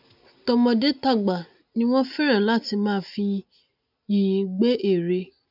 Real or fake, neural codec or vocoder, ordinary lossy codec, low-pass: real; none; none; 5.4 kHz